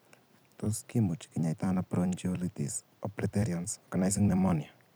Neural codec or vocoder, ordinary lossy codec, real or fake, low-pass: vocoder, 44.1 kHz, 128 mel bands every 256 samples, BigVGAN v2; none; fake; none